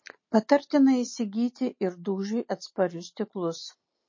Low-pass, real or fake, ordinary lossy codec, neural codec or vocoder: 7.2 kHz; real; MP3, 32 kbps; none